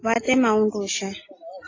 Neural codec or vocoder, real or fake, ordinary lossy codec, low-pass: none; real; AAC, 32 kbps; 7.2 kHz